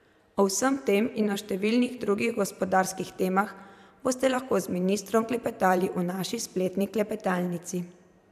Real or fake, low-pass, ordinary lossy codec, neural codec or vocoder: fake; 14.4 kHz; none; vocoder, 44.1 kHz, 128 mel bands every 512 samples, BigVGAN v2